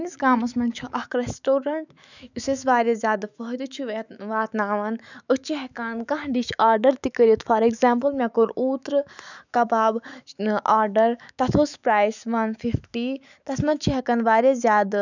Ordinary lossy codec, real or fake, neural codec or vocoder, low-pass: none; fake; autoencoder, 48 kHz, 128 numbers a frame, DAC-VAE, trained on Japanese speech; 7.2 kHz